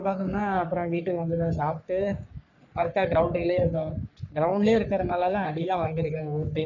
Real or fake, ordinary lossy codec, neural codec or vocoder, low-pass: fake; none; codec, 44.1 kHz, 3.4 kbps, Pupu-Codec; 7.2 kHz